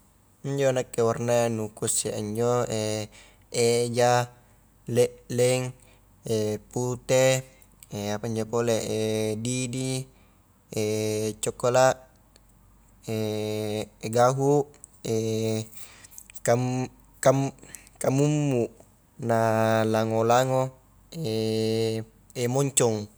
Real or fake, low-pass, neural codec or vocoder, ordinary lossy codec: real; none; none; none